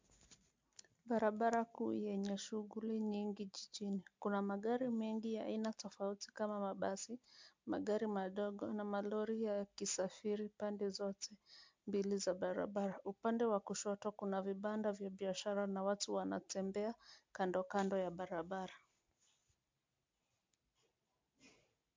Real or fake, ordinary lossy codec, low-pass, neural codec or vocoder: real; MP3, 64 kbps; 7.2 kHz; none